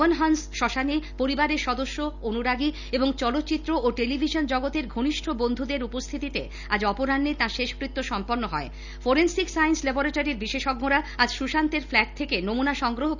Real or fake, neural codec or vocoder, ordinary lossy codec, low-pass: real; none; none; 7.2 kHz